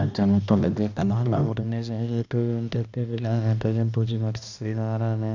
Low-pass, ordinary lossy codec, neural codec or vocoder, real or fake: 7.2 kHz; none; codec, 16 kHz, 1 kbps, X-Codec, HuBERT features, trained on balanced general audio; fake